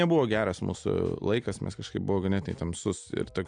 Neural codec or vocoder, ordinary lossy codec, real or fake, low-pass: none; AAC, 64 kbps; real; 9.9 kHz